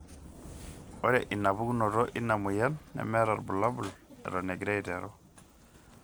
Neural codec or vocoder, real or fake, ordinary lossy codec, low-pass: none; real; none; none